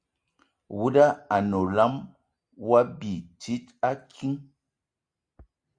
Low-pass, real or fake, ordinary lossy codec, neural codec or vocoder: 9.9 kHz; real; Opus, 64 kbps; none